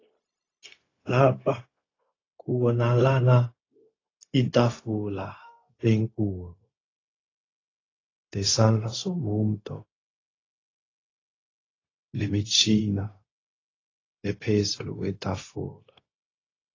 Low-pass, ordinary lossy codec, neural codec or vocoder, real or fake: 7.2 kHz; AAC, 32 kbps; codec, 16 kHz, 0.4 kbps, LongCat-Audio-Codec; fake